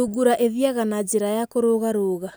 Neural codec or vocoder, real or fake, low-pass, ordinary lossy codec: none; real; none; none